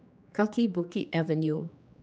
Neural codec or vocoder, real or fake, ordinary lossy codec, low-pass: codec, 16 kHz, 2 kbps, X-Codec, HuBERT features, trained on general audio; fake; none; none